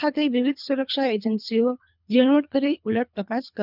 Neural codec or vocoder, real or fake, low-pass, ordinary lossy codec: codec, 24 kHz, 3 kbps, HILCodec; fake; 5.4 kHz; none